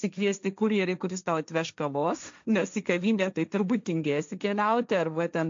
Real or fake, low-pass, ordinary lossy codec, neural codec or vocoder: fake; 7.2 kHz; MP3, 64 kbps; codec, 16 kHz, 1.1 kbps, Voila-Tokenizer